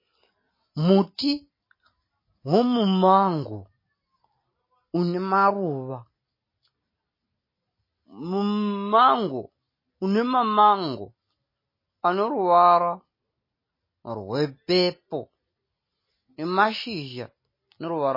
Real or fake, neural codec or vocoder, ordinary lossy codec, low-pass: real; none; MP3, 24 kbps; 5.4 kHz